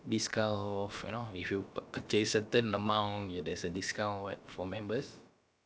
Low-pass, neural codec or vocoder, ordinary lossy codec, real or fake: none; codec, 16 kHz, about 1 kbps, DyCAST, with the encoder's durations; none; fake